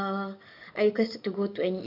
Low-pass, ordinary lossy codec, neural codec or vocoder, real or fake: 5.4 kHz; none; codec, 44.1 kHz, 7.8 kbps, DAC; fake